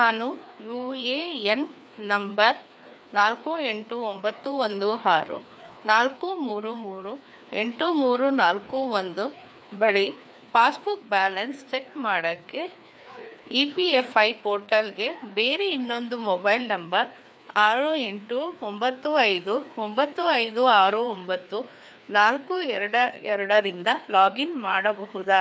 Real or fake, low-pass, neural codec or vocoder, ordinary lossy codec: fake; none; codec, 16 kHz, 2 kbps, FreqCodec, larger model; none